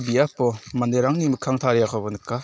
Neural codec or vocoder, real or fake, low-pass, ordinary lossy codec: none; real; none; none